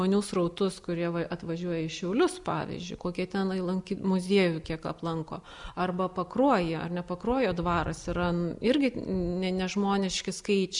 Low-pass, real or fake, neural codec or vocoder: 10.8 kHz; real; none